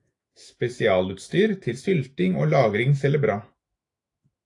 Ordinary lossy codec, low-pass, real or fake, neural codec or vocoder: AAC, 48 kbps; 10.8 kHz; fake; autoencoder, 48 kHz, 128 numbers a frame, DAC-VAE, trained on Japanese speech